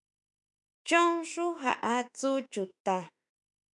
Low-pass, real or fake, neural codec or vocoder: 10.8 kHz; fake; autoencoder, 48 kHz, 32 numbers a frame, DAC-VAE, trained on Japanese speech